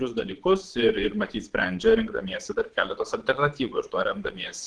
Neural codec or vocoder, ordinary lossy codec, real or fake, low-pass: vocoder, 22.05 kHz, 80 mel bands, WaveNeXt; Opus, 16 kbps; fake; 9.9 kHz